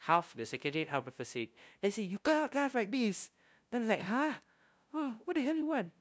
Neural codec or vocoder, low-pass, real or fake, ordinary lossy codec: codec, 16 kHz, 0.5 kbps, FunCodec, trained on LibriTTS, 25 frames a second; none; fake; none